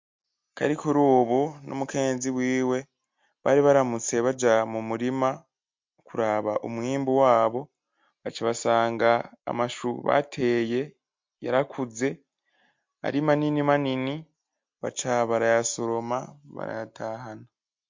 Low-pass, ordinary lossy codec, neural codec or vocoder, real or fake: 7.2 kHz; MP3, 48 kbps; none; real